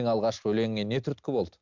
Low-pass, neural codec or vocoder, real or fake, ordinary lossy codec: 7.2 kHz; none; real; MP3, 64 kbps